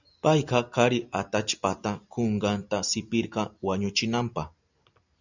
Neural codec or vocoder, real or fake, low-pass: none; real; 7.2 kHz